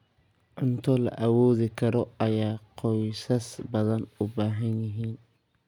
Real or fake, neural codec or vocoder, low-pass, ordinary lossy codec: real; none; 19.8 kHz; none